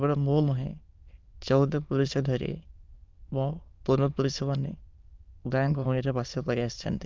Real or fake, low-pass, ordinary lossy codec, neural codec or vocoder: fake; 7.2 kHz; Opus, 24 kbps; autoencoder, 22.05 kHz, a latent of 192 numbers a frame, VITS, trained on many speakers